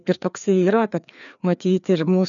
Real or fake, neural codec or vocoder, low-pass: fake; codec, 16 kHz, 2 kbps, FreqCodec, larger model; 7.2 kHz